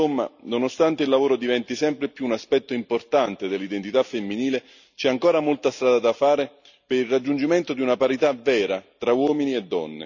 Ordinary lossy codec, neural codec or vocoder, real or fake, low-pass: none; none; real; 7.2 kHz